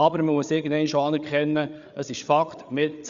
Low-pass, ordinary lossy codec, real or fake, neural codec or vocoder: 7.2 kHz; Opus, 64 kbps; fake; codec, 16 kHz, 8 kbps, FreqCodec, larger model